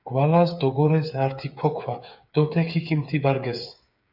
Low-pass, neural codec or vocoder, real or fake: 5.4 kHz; codec, 16 kHz, 8 kbps, FreqCodec, smaller model; fake